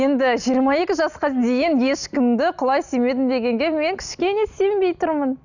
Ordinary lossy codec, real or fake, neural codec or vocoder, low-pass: none; real; none; 7.2 kHz